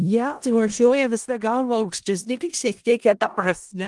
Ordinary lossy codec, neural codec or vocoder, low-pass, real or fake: Opus, 24 kbps; codec, 16 kHz in and 24 kHz out, 0.4 kbps, LongCat-Audio-Codec, four codebook decoder; 10.8 kHz; fake